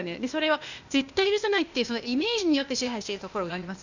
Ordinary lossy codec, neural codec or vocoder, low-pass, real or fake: none; codec, 16 kHz, 0.8 kbps, ZipCodec; 7.2 kHz; fake